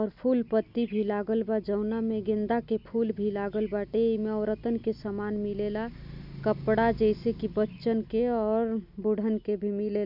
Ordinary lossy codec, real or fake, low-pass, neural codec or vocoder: none; real; 5.4 kHz; none